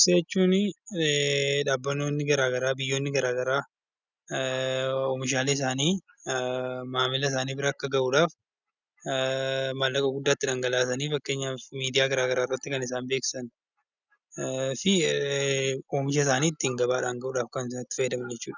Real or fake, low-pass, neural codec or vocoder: real; 7.2 kHz; none